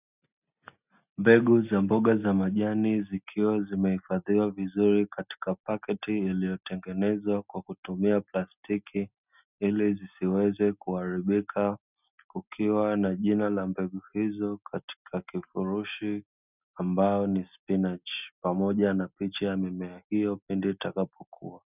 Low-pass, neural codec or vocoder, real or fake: 3.6 kHz; none; real